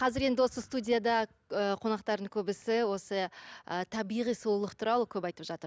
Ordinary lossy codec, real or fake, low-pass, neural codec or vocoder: none; real; none; none